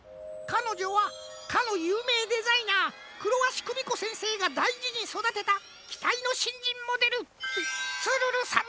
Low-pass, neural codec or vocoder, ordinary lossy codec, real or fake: none; none; none; real